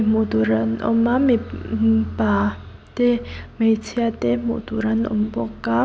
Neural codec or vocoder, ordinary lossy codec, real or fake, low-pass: none; none; real; none